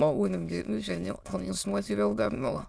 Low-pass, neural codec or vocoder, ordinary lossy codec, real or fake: none; autoencoder, 22.05 kHz, a latent of 192 numbers a frame, VITS, trained on many speakers; none; fake